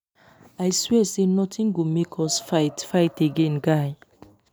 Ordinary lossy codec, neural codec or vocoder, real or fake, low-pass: none; none; real; none